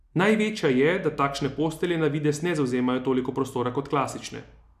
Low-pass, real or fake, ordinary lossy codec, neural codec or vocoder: 14.4 kHz; real; none; none